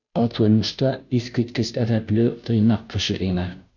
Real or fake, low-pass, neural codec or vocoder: fake; 7.2 kHz; codec, 16 kHz, 0.5 kbps, FunCodec, trained on Chinese and English, 25 frames a second